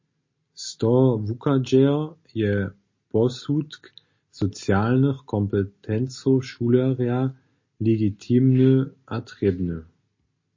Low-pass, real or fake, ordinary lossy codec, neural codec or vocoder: 7.2 kHz; real; MP3, 32 kbps; none